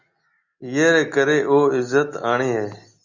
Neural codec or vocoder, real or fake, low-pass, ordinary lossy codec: none; real; 7.2 kHz; Opus, 64 kbps